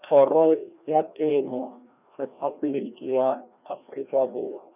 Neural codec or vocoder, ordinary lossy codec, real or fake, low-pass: codec, 16 kHz, 1 kbps, FreqCodec, larger model; none; fake; 3.6 kHz